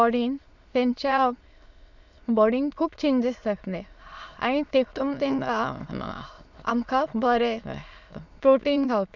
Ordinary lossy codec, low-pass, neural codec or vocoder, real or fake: none; 7.2 kHz; autoencoder, 22.05 kHz, a latent of 192 numbers a frame, VITS, trained on many speakers; fake